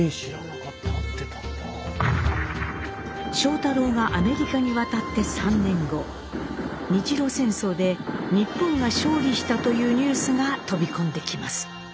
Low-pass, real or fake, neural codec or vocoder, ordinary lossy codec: none; real; none; none